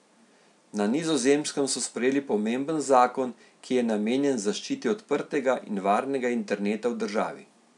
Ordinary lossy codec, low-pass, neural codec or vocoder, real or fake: none; 10.8 kHz; none; real